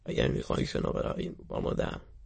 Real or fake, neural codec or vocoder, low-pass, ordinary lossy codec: fake; autoencoder, 22.05 kHz, a latent of 192 numbers a frame, VITS, trained on many speakers; 9.9 kHz; MP3, 32 kbps